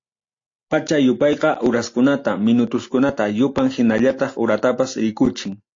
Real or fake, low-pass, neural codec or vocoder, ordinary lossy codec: real; 7.2 kHz; none; AAC, 48 kbps